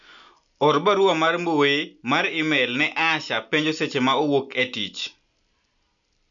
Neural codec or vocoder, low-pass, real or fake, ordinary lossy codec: none; 7.2 kHz; real; none